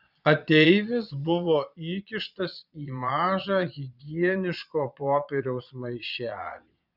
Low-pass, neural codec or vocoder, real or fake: 5.4 kHz; vocoder, 22.05 kHz, 80 mel bands, Vocos; fake